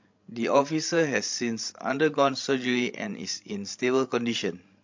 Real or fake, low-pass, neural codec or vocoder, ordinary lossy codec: fake; 7.2 kHz; codec, 16 kHz, 16 kbps, FreqCodec, larger model; MP3, 48 kbps